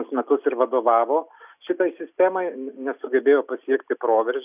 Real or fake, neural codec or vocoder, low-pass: real; none; 3.6 kHz